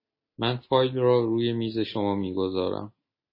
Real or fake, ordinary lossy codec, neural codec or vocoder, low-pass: real; MP3, 24 kbps; none; 5.4 kHz